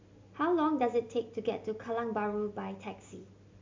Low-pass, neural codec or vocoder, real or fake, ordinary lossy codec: 7.2 kHz; none; real; MP3, 64 kbps